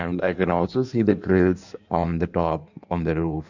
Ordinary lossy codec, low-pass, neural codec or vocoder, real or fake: none; 7.2 kHz; codec, 16 kHz in and 24 kHz out, 1.1 kbps, FireRedTTS-2 codec; fake